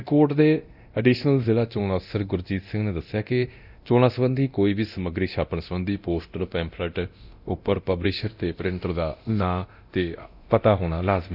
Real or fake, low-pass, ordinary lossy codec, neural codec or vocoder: fake; 5.4 kHz; none; codec, 24 kHz, 0.9 kbps, DualCodec